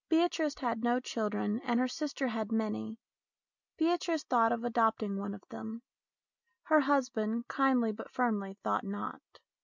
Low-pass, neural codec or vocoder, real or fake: 7.2 kHz; none; real